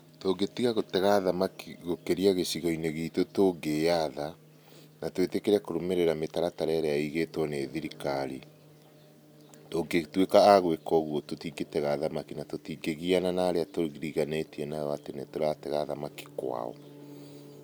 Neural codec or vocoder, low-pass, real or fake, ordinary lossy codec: none; none; real; none